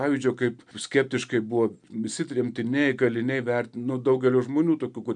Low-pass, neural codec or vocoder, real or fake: 9.9 kHz; none; real